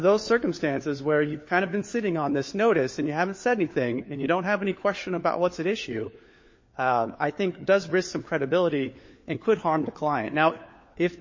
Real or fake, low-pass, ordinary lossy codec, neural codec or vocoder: fake; 7.2 kHz; MP3, 32 kbps; codec, 16 kHz, 4 kbps, FunCodec, trained on LibriTTS, 50 frames a second